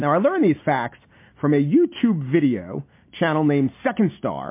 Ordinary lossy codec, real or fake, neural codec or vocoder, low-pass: MP3, 24 kbps; real; none; 3.6 kHz